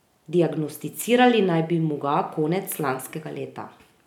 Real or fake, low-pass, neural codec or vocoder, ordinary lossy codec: real; 19.8 kHz; none; none